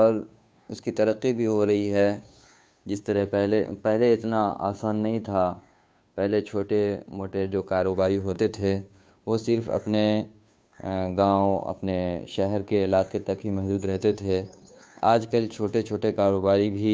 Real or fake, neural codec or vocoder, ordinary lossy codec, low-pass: fake; codec, 16 kHz, 2 kbps, FunCodec, trained on Chinese and English, 25 frames a second; none; none